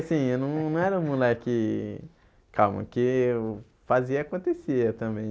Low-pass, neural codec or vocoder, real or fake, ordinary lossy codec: none; none; real; none